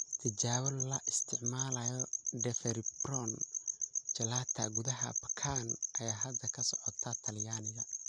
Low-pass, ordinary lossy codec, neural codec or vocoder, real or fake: 9.9 kHz; none; none; real